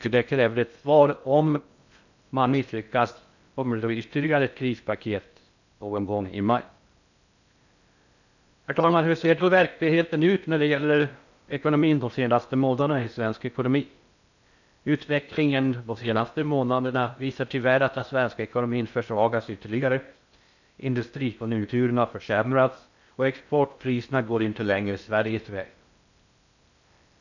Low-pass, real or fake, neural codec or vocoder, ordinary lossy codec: 7.2 kHz; fake; codec, 16 kHz in and 24 kHz out, 0.6 kbps, FocalCodec, streaming, 2048 codes; none